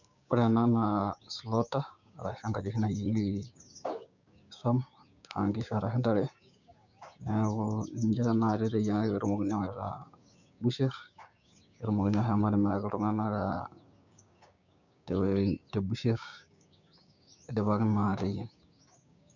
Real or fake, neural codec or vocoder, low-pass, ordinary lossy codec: fake; codec, 16 kHz, 6 kbps, DAC; 7.2 kHz; none